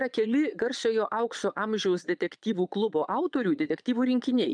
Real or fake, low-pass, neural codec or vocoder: fake; 9.9 kHz; vocoder, 22.05 kHz, 80 mel bands, Vocos